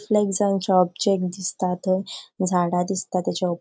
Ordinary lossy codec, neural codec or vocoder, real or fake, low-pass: none; none; real; none